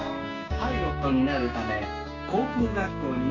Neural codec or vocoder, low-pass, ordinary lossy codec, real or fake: codec, 16 kHz, 6 kbps, DAC; 7.2 kHz; none; fake